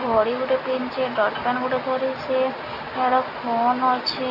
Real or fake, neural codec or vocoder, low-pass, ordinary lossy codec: real; none; 5.4 kHz; Opus, 64 kbps